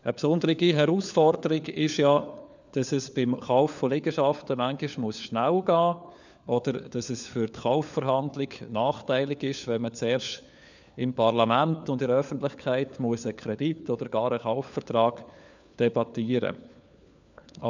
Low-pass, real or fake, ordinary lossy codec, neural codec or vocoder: 7.2 kHz; fake; none; codec, 16 kHz, 4 kbps, FunCodec, trained on LibriTTS, 50 frames a second